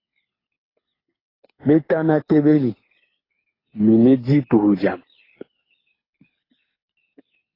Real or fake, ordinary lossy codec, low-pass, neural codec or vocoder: fake; AAC, 24 kbps; 5.4 kHz; codec, 24 kHz, 6 kbps, HILCodec